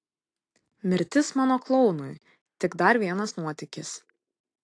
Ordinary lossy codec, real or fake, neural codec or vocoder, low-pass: AAC, 48 kbps; real; none; 9.9 kHz